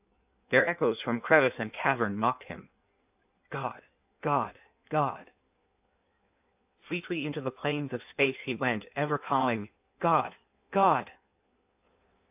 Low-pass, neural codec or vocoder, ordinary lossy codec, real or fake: 3.6 kHz; codec, 16 kHz in and 24 kHz out, 1.1 kbps, FireRedTTS-2 codec; AAC, 32 kbps; fake